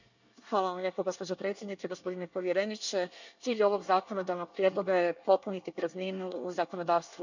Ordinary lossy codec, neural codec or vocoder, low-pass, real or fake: none; codec, 24 kHz, 1 kbps, SNAC; 7.2 kHz; fake